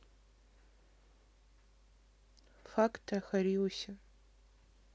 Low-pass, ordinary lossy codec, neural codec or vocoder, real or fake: none; none; none; real